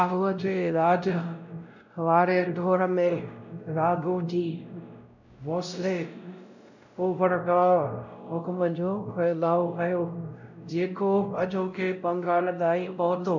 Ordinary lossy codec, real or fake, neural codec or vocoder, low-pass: none; fake; codec, 16 kHz, 0.5 kbps, X-Codec, WavLM features, trained on Multilingual LibriSpeech; 7.2 kHz